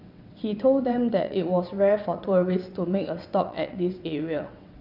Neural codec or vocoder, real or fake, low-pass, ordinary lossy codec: vocoder, 22.05 kHz, 80 mel bands, WaveNeXt; fake; 5.4 kHz; none